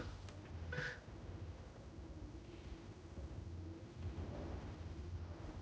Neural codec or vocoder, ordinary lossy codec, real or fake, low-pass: codec, 16 kHz, 0.5 kbps, X-Codec, HuBERT features, trained on general audio; none; fake; none